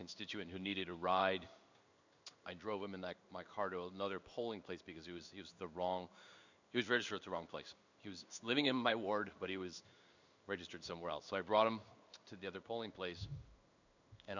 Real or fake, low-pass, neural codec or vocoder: fake; 7.2 kHz; codec, 16 kHz in and 24 kHz out, 1 kbps, XY-Tokenizer